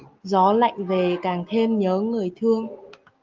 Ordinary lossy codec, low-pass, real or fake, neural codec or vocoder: Opus, 32 kbps; 7.2 kHz; real; none